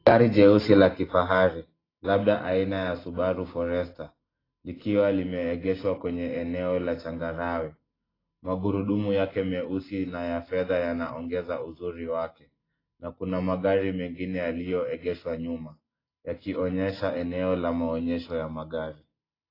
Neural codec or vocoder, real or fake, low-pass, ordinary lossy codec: none; real; 5.4 kHz; AAC, 24 kbps